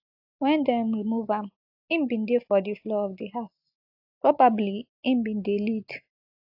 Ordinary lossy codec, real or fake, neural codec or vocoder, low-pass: AAC, 48 kbps; real; none; 5.4 kHz